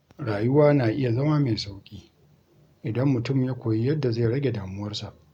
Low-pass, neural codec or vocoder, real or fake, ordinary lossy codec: 19.8 kHz; none; real; none